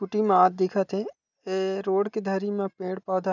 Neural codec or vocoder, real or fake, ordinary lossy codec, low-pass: none; real; none; 7.2 kHz